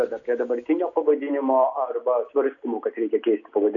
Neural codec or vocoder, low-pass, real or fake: none; 7.2 kHz; real